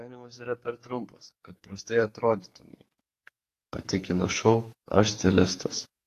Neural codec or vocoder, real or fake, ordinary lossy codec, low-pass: codec, 44.1 kHz, 2.6 kbps, SNAC; fake; AAC, 48 kbps; 14.4 kHz